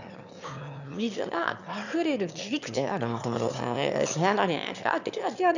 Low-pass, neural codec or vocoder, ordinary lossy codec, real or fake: 7.2 kHz; autoencoder, 22.05 kHz, a latent of 192 numbers a frame, VITS, trained on one speaker; none; fake